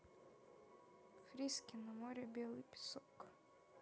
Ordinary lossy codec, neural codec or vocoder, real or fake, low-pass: none; none; real; none